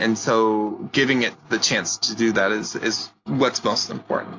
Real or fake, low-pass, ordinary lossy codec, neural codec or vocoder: real; 7.2 kHz; AAC, 48 kbps; none